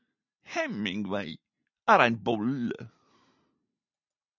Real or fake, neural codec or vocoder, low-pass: real; none; 7.2 kHz